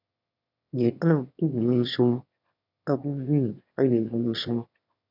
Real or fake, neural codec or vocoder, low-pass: fake; autoencoder, 22.05 kHz, a latent of 192 numbers a frame, VITS, trained on one speaker; 5.4 kHz